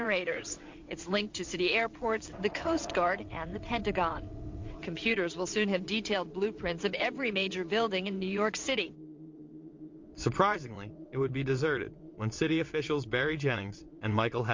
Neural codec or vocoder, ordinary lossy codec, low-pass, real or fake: vocoder, 22.05 kHz, 80 mel bands, WaveNeXt; MP3, 48 kbps; 7.2 kHz; fake